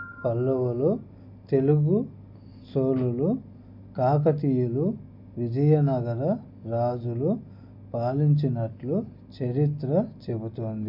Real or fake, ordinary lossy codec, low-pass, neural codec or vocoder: real; MP3, 48 kbps; 5.4 kHz; none